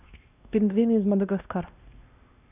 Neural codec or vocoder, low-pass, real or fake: codec, 16 kHz, 1 kbps, X-Codec, WavLM features, trained on Multilingual LibriSpeech; 3.6 kHz; fake